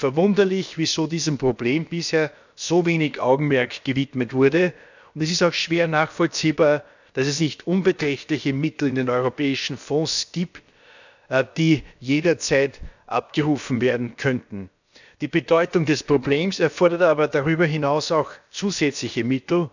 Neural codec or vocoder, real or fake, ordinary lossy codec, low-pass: codec, 16 kHz, about 1 kbps, DyCAST, with the encoder's durations; fake; none; 7.2 kHz